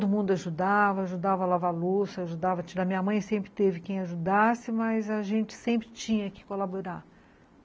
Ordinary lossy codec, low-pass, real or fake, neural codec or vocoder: none; none; real; none